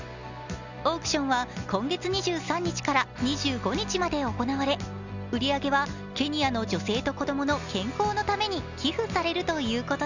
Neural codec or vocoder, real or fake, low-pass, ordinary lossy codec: none; real; 7.2 kHz; none